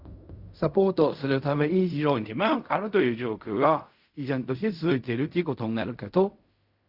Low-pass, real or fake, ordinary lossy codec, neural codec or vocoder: 5.4 kHz; fake; Opus, 64 kbps; codec, 16 kHz in and 24 kHz out, 0.4 kbps, LongCat-Audio-Codec, fine tuned four codebook decoder